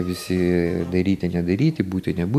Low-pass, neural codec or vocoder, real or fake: 14.4 kHz; none; real